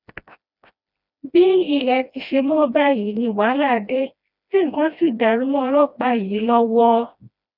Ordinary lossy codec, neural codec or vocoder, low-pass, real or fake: none; codec, 16 kHz, 1 kbps, FreqCodec, smaller model; 5.4 kHz; fake